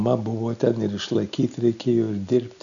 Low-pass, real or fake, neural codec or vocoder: 7.2 kHz; real; none